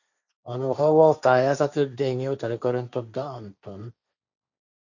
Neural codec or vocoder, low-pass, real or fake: codec, 16 kHz, 1.1 kbps, Voila-Tokenizer; 7.2 kHz; fake